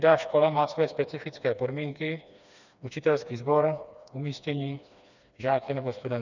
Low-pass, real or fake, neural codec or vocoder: 7.2 kHz; fake; codec, 16 kHz, 2 kbps, FreqCodec, smaller model